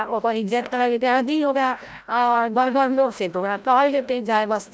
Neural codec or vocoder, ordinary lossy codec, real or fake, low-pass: codec, 16 kHz, 0.5 kbps, FreqCodec, larger model; none; fake; none